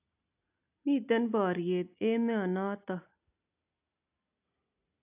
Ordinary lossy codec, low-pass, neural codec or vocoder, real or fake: AAC, 32 kbps; 3.6 kHz; none; real